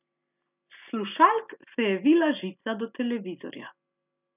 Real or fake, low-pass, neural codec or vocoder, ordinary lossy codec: real; 3.6 kHz; none; none